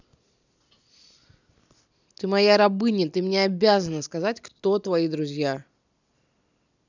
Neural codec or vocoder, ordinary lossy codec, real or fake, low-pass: none; none; real; 7.2 kHz